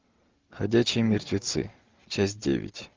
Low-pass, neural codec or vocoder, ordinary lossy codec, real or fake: 7.2 kHz; none; Opus, 32 kbps; real